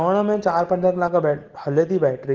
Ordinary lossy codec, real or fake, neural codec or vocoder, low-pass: Opus, 16 kbps; real; none; 7.2 kHz